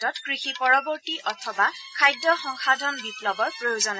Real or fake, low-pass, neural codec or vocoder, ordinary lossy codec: real; none; none; none